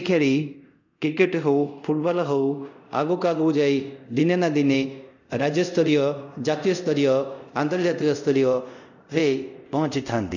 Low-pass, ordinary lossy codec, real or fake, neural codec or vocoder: 7.2 kHz; none; fake; codec, 24 kHz, 0.5 kbps, DualCodec